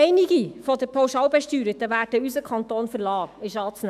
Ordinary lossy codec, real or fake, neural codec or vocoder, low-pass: none; fake; autoencoder, 48 kHz, 128 numbers a frame, DAC-VAE, trained on Japanese speech; 14.4 kHz